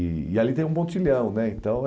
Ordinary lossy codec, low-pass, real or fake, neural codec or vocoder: none; none; real; none